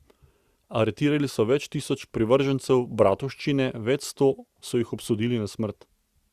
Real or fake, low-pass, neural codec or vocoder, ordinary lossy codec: real; 14.4 kHz; none; Opus, 64 kbps